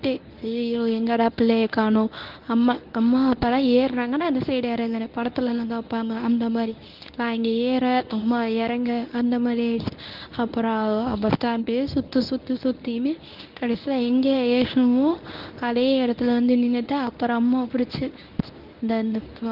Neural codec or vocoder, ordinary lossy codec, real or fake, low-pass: codec, 24 kHz, 0.9 kbps, WavTokenizer, medium speech release version 1; Opus, 24 kbps; fake; 5.4 kHz